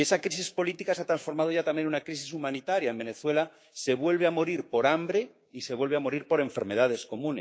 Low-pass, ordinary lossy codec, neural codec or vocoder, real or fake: none; none; codec, 16 kHz, 6 kbps, DAC; fake